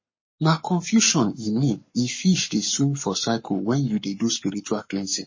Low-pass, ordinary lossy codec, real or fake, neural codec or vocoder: 7.2 kHz; MP3, 32 kbps; fake; codec, 44.1 kHz, 7.8 kbps, Pupu-Codec